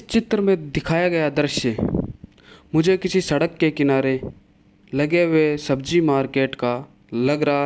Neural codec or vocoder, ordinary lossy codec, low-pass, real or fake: none; none; none; real